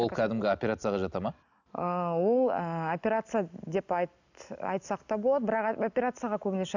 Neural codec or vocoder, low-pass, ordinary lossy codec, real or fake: none; 7.2 kHz; none; real